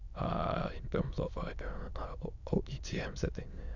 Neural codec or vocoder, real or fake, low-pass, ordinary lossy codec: autoencoder, 22.05 kHz, a latent of 192 numbers a frame, VITS, trained on many speakers; fake; 7.2 kHz; none